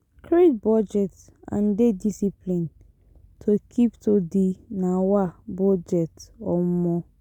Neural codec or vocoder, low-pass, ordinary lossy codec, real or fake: none; 19.8 kHz; none; real